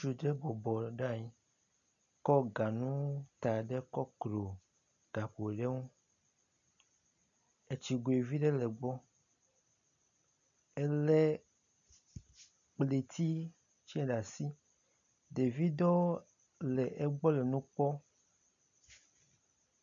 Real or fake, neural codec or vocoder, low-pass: real; none; 7.2 kHz